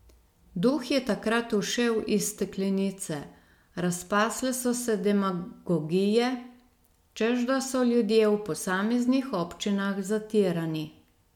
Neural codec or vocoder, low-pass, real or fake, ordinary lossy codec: none; 19.8 kHz; real; MP3, 96 kbps